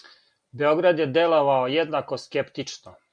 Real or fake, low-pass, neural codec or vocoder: real; 9.9 kHz; none